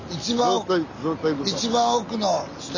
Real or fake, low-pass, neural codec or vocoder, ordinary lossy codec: real; 7.2 kHz; none; none